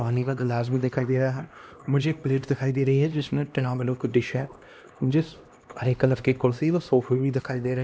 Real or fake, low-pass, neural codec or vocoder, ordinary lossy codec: fake; none; codec, 16 kHz, 1 kbps, X-Codec, HuBERT features, trained on LibriSpeech; none